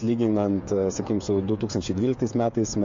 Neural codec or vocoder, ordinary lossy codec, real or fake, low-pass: codec, 16 kHz, 8 kbps, FreqCodec, smaller model; MP3, 48 kbps; fake; 7.2 kHz